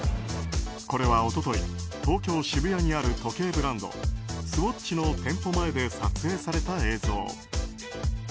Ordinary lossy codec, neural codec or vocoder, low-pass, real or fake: none; none; none; real